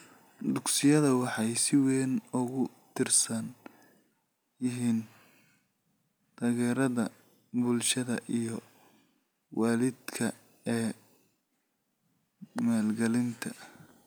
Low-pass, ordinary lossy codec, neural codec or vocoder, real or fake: none; none; none; real